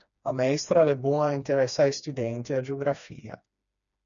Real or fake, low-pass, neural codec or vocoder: fake; 7.2 kHz; codec, 16 kHz, 2 kbps, FreqCodec, smaller model